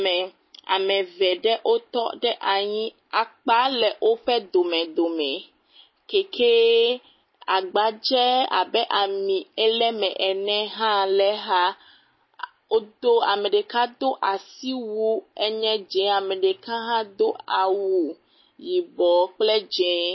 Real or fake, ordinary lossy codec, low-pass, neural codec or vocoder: real; MP3, 24 kbps; 7.2 kHz; none